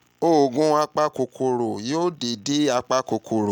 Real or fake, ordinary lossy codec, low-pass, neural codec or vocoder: real; none; 19.8 kHz; none